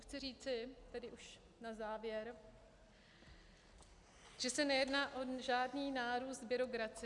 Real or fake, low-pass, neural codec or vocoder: real; 10.8 kHz; none